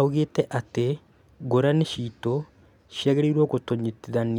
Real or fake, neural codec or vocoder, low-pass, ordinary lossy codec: real; none; 19.8 kHz; none